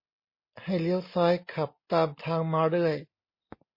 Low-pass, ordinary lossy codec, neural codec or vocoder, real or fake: 5.4 kHz; MP3, 24 kbps; none; real